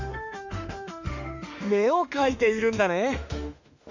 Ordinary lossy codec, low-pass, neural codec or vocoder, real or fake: none; 7.2 kHz; autoencoder, 48 kHz, 32 numbers a frame, DAC-VAE, trained on Japanese speech; fake